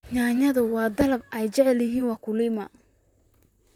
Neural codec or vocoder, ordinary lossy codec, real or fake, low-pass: vocoder, 44.1 kHz, 128 mel bands, Pupu-Vocoder; none; fake; 19.8 kHz